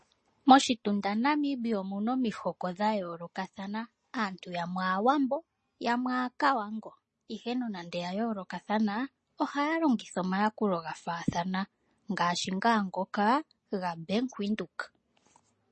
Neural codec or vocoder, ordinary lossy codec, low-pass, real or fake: none; MP3, 32 kbps; 10.8 kHz; real